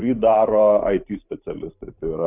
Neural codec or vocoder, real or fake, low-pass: vocoder, 44.1 kHz, 128 mel bands every 512 samples, BigVGAN v2; fake; 3.6 kHz